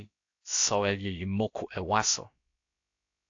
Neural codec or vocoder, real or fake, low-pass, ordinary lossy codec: codec, 16 kHz, about 1 kbps, DyCAST, with the encoder's durations; fake; 7.2 kHz; AAC, 48 kbps